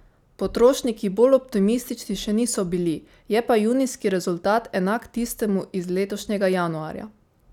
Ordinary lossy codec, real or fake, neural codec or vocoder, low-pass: none; real; none; 19.8 kHz